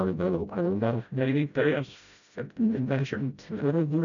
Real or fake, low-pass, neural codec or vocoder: fake; 7.2 kHz; codec, 16 kHz, 0.5 kbps, FreqCodec, smaller model